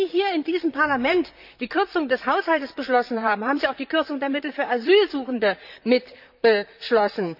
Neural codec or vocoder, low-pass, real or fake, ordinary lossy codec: vocoder, 44.1 kHz, 128 mel bands, Pupu-Vocoder; 5.4 kHz; fake; none